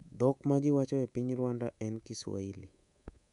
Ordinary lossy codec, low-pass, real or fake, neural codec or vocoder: none; 10.8 kHz; fake; codec, 24 kHz, 3.1 kbps, DualCodec